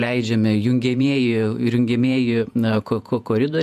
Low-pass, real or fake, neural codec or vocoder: 14.4 kHz; fake; vocoder, 48 kHz, 128 mel bands, Vocos